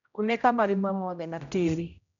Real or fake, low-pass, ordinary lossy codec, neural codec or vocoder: fake; 7.2 kHz; none; codec, 16 kHz, 0.5 kbps, X-Codec, HuBERT features, trained on general audio